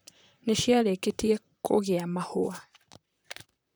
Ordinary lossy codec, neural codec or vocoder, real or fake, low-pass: none; vocoder, 44.1 kHz, 128 mel bands, Pupu-Vocoder; fake; none